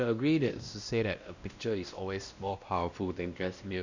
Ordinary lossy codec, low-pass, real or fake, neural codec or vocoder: none; 7.2 kHz; fake; codec, 16 kHz, 1 kbps, X-Codec, WavLM features, trained on Multilingual LibriSpeech